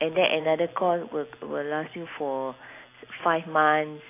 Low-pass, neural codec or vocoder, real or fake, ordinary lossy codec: 3.6 kHz; none; real; AAC, 24 kbps